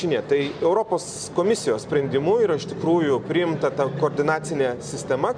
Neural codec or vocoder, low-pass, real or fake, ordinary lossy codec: none; 9.9 kHz; real; MP3, 96 kbps